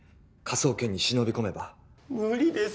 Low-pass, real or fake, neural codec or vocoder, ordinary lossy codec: none; real; none; none